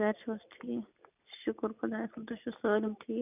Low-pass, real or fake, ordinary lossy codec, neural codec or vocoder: 3.6 kHz; real; none; none